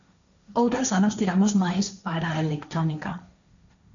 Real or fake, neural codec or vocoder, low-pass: fake; codec, 16 kHz, 1.1 kbps, Voila-Tokenizer; 7.2 kHz